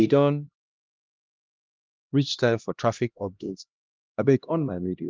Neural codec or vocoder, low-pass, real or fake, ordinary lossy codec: codec, 16 kHz, 1 kbps, X-Codec, HuBERT features, trained on LibriSpeech; 7.2 kHz; fake; Opus, 24 kbps